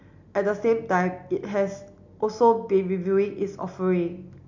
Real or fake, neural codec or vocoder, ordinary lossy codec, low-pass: real; none; none; 7.2 kHz